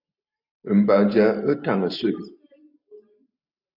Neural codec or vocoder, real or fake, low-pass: none; real; 5.4 kHz